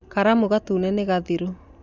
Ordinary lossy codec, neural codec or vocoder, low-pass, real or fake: none; none; 7.2 kHz; real